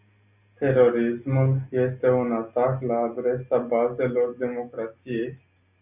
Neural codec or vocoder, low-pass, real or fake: none; 3.6 kHz; real